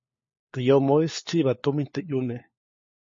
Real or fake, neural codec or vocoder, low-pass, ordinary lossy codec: fake; codec, 16 kHz, 4 kbps, FunCodec, trained on LibriTTS, 50 frames a second; 7.2 kHz; MP3, 32 kbps